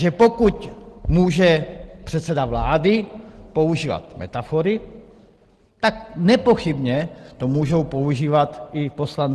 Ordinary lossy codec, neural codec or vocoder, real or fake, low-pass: Opus, 16 kbps; none; real; 10.8 kHz